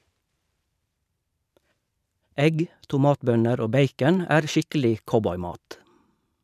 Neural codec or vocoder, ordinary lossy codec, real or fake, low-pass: vocoder, 44.1 kHz, 128 mel bands every 512 samples, BigVGAN v2; none; fake; 14.4 kHz